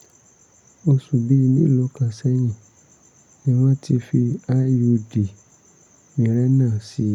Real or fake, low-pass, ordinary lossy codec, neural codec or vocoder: real; 19.8 kHz; none; none